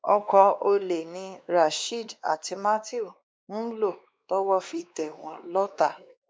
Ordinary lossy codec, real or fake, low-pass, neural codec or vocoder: none; fake; none; codec, 16 kHz, 2 kbps, X-Codec, WavLM features, trained on Multilingual LibriSpeech